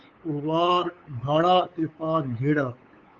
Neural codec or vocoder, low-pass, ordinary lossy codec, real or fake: codec, 16 kHz, 8 kbps, FunCodec, trained on LibriTTS, 25 frames a second; 7.2 kHz; Opus, 32 kbps; fake